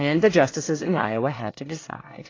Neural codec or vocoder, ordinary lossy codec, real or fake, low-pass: codec, 24 kHz, 1 kbps, SNAC; AAC, 32 kbps; fake; 7.2 kHz